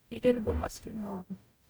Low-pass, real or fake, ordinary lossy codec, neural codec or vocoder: none; fake; none; codec, 44.1 kHz, 0.9 kbps, DAC